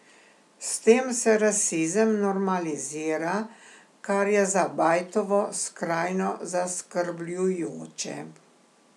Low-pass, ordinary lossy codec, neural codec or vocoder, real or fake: none; none; none; real